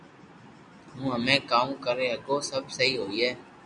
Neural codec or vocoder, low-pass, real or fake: none; 9.9 kHz; real